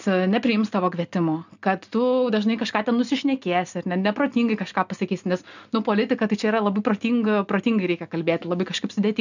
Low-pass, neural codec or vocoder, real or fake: 7.2 kHz; none; real